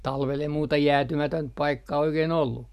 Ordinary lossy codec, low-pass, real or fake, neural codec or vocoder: none; 14.4 kHz; real; none